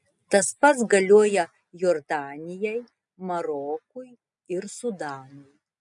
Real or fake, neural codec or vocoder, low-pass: real; none; 10.8 kHz